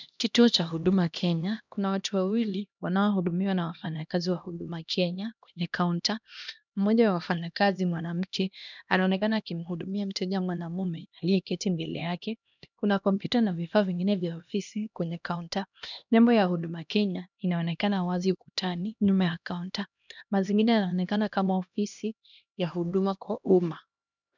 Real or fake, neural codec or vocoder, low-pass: fake; codec, 16 kHz, 1 kbps, X-Codec, HuBERT features, trained on LibriSpeech; 7.2 kHz